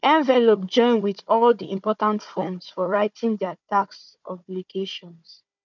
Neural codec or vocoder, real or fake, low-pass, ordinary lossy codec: codec, 16 kHz, 4 kbps, FunCodec, trained on Chinese and English, 50 frames a second; fake; 7.2 kHz; none